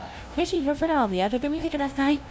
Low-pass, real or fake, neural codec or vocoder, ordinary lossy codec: none; fake; codec, 16 kHz, 0.5 kbps, FunCodec, trained on LibriTTS, 25 frames a second; none